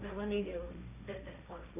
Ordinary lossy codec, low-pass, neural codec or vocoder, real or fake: none; 3.6 kHz; codec, 16 kHz, 1.1 kbps, Voila-Tokenizer; fake